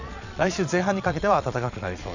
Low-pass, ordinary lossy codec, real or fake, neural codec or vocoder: 7.2 kHz; none; fake; vocoder, 22.05 kHz, 80 mel bands, Vocos